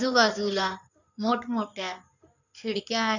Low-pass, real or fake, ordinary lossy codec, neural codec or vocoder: 7.2 kHz; fake; none; codec, 16 kHz in and 24 kHz out, 2.2 kbps, FireRedTTS-2 codec